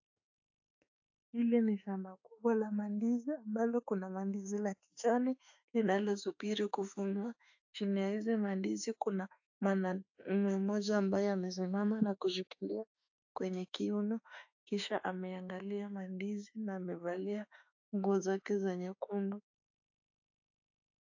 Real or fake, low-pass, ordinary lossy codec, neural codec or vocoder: fake; 7.2 kHz; AAC, 48 kbps; autoencoder, 48 kHz, 32 numbers a frame, DAC-VAE, trained on Japanese speech